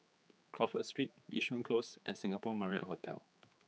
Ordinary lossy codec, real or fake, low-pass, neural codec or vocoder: none; fake; none; codec, 16 kHz, 4 kbps, X-Codec, HuBERT features, trained on general audio